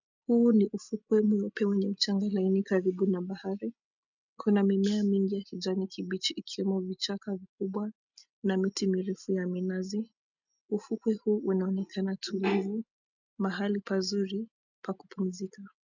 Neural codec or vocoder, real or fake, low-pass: none; real; 7.2 kHz